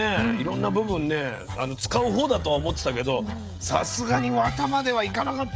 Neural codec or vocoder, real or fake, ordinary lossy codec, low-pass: codec, 16 kHz, 16 kbps, FreqCodec, smaller model; fake; none; none